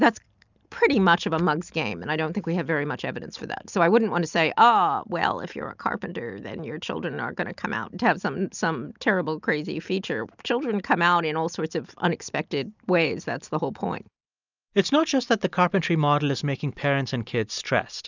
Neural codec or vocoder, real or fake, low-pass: none; real; 7.2 kHz